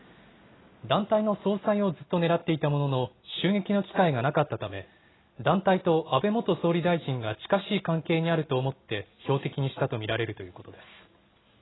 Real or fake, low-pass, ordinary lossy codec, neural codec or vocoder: real; 7.2 kHz; AAC, 16 kbps; none